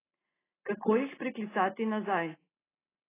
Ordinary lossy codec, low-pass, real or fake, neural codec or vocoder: AAC, 16 kbps; 3.6 kHz; real; none